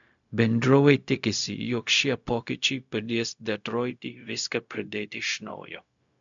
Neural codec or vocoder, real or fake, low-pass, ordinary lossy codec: codec, 16 kHz, 0.4 kbps, LongCat-Audio-Codec; fake; 7.2 kHz; MP3, 64 kbps